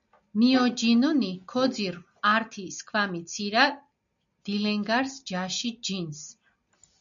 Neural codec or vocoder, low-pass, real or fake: none; 7.2 kHz; real